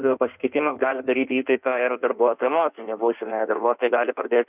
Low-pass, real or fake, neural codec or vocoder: 3.6 kHz; fake; codec, 16 kHz in and 24 kHz out, 1.1 kbps, FireRedTTS-2 codec